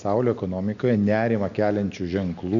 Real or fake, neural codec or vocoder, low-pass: real; none; 7.2 kHz